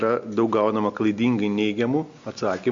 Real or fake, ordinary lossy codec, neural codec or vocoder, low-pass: real; MP3, 48 kbps; none; 7.2 kHz